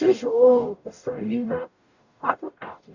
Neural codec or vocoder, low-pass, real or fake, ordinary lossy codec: codec, 44.1 kHz, 0.9 kbps, DAC; 7.2 kHz; fake; none